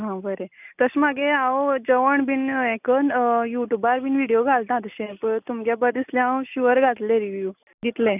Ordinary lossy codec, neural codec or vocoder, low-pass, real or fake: none; none; 3.6 kHz; real